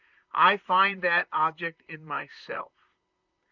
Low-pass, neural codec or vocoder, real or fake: 7.2 kHz; vocoder, 44.1 kHz, 128 mel bands, Pupu-Vocoder; fake